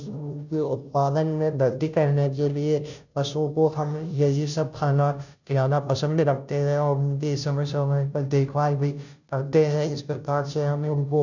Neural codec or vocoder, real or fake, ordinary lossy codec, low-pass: codec, 16 kHz, 0.5 kbps, FunCodec, trained on Chinese and English, 25 frames a second; fake; none; 7.2 kHz